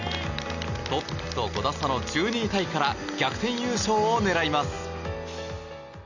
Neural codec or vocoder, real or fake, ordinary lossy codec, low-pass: none; real; none; 7.2 kHz